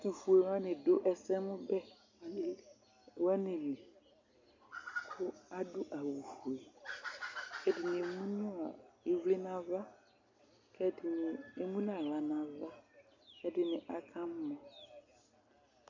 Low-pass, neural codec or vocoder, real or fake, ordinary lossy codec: 7.2 kHz; none; real; MP3, 48 kbps